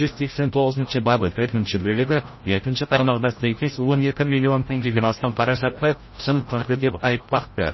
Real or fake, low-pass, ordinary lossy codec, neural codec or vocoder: fake; 7.2 kHz; MP3, 24 kbps; codec, 16 kHz, 0.5 kbps, FreqCodec, larger model